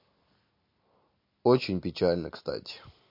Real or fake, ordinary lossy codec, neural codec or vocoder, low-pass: real; MP3, 32 kbps; none; 5.4 kHz